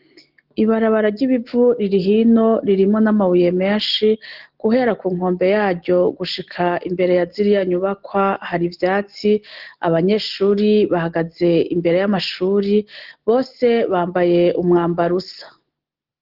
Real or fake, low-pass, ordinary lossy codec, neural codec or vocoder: real; 5.4 kHz; Opus, 16 kbps; none